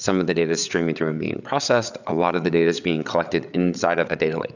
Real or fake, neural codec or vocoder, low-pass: fake; codec, 16 kHz, 8 kbps, FreqCodec, larger model; 7.2 kHz